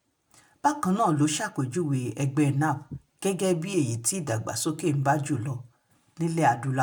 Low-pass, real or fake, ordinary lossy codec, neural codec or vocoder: none; real; none; none